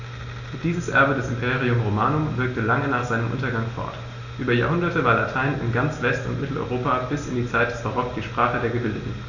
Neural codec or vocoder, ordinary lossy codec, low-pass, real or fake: none; none; 7.2 kHz; real